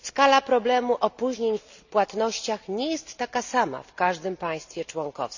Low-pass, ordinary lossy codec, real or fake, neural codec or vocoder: 7.2 kHz; none; real; none